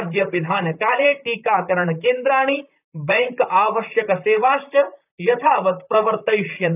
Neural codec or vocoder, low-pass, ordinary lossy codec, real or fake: vocoder, 44.1 kHz, 128 mel bands, Pupu-Vocoder; 3.6 kHz; none; fake